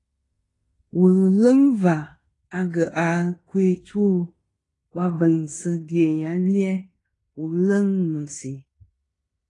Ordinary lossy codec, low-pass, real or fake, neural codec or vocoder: AAC, 32 kbps; 10.8 kHz; fake; codec, 16 kHz in and 24 kHz out, 0.9 kbps, LongCat-Audio-Codec, fine tuned four codebook decoder